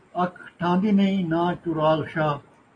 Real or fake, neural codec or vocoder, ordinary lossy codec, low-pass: real; none; AAC, 32 kbps; 9.9 kHz